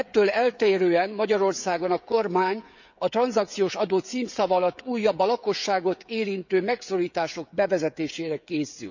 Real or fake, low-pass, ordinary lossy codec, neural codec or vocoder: fake; 7.2 kHz; none; codec, 16 kHz, 16 kbps, FreqCodec, smaller model